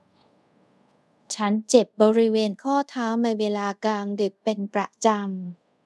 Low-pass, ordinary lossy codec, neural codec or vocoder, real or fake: none; none; codec, 24 kHz, 0.5 kbps, DualCodec; fake